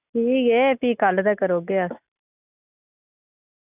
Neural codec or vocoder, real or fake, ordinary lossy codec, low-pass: none; real; none; 3.6 kHz